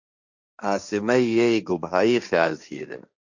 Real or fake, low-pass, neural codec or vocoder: fake; 7.2 kHz; codec, 16 kHz, 1.1 kbps, Voila-Tokenizer